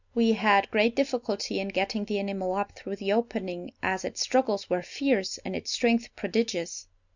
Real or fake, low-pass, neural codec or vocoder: real; 7.2 kHz; none